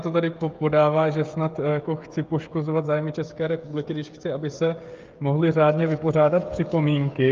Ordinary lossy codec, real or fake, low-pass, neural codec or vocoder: Opus, 32 kbps; fake; 7.2 kHz; codec, 16 kHz, 16 kbps, FreqCodec, smaller model